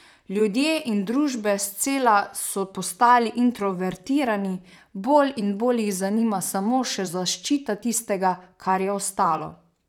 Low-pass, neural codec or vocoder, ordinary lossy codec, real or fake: 19.8 kHz; vocoder, 44.1 kHz, 128 mel bands, Pupu-Vocoder; none; fake